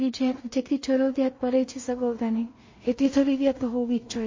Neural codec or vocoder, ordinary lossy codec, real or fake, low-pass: codec, 16 kHz in and 24 kHz out, 0.4 kbps, LongCat-Audio-Codec, two codebook decoder; MP3, 32 kbps; fake; 7.2 kHz